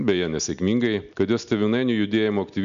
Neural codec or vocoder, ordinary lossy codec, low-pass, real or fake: none; AAC, 96 kbps; 7.2 kHz; real